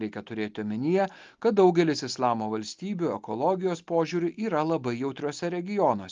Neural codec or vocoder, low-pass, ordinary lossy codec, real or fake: none; 7.2 kHz; Opus, 32 kbps; real